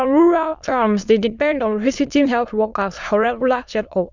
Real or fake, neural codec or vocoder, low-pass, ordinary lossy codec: fake; autoencoder, 22.05 kHz, a latent of 192 numbers a frame, VITS, trained on many speakers; 7.2 kHz; none